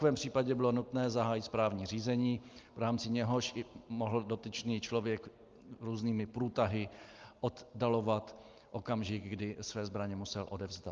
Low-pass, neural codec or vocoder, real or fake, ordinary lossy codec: 7.2 kHz; none; real; Opus, 24 kbps